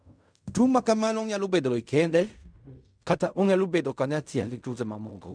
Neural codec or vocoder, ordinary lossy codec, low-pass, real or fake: codec, 16 kHz in and 24 kHz out, 0.4 kbps, LongCat-Audio-Codec, fine tuned four codebook decoder; none; 9.9 kHz; fake